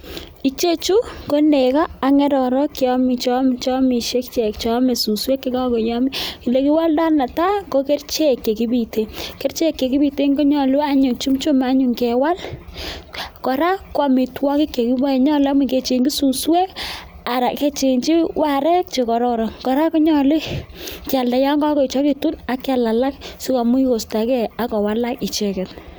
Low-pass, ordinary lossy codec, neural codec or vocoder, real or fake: none; none; none; real